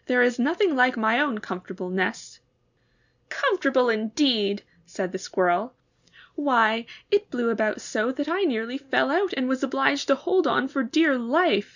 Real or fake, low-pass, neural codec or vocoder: real; 7.2 kHz; none